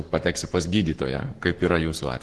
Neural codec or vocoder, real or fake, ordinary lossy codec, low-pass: codec, 44.1 kHz, 7.8 kbps, Pupu-Codec; fake; Opus, 16 kbps; 10.8 kHz